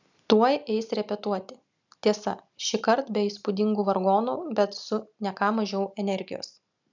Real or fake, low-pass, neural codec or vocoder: real; 7.2 kHz; none